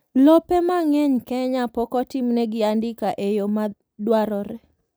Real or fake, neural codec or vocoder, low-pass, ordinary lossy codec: real; none; none; none